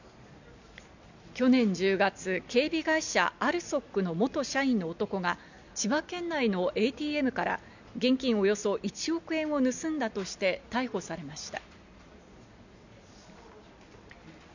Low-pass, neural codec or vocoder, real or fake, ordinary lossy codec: 7.2 kHz; none; real; none